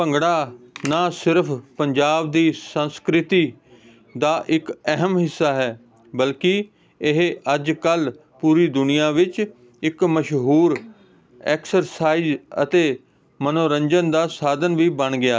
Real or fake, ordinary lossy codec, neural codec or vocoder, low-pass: real; none; none; none